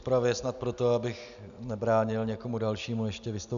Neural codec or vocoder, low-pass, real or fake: none; 7.2 kHz; real